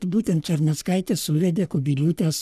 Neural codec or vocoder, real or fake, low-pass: codec, 44.1 kHz, 3.4 kbps, Pupu-Codec; fake; 14.4 kHz